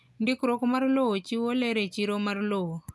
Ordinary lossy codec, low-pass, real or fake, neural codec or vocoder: none; none; real; none